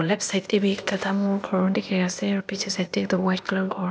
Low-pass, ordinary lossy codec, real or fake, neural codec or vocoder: none; none; fake; codec, 16 kHz, 0.8 kbps, ZipCodec